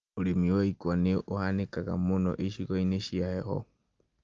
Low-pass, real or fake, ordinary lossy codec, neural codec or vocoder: 7.2 kHz; real; Opus, 24 kbps; none